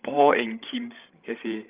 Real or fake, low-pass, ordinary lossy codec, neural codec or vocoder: fake; 3.6 kHz; Opus, 24 kbps; codec, 16 kHz, 16 kbps, FreqCodec, larger model